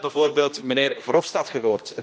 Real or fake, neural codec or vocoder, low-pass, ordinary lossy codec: fake; codec, 16 kHz, 1 kbps, X-Codec, HuBERT features, trained on general audio; none; none